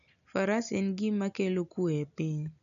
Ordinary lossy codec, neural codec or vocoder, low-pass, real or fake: none; none; 7.2 kHz; real